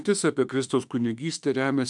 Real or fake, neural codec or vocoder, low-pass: fake; autoencoder, 48 kHz, 32 numbers a frame, DAC-VAE, trained on Japanese speech; 10.8 kHz